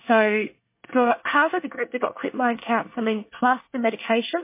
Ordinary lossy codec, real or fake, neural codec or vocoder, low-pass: MP3, 24 kbps; fake; codec, 24 kHz, 1 kbps, SNAC; 3.6 kHz